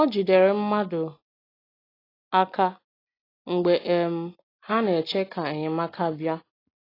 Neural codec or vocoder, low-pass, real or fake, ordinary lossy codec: none; 5.4 kHz; real; AAC, 24 kbps